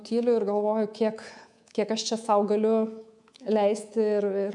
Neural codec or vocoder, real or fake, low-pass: codec, 24 kHz, 3.1 kbps, DualCodec; fake; 10.8 kHz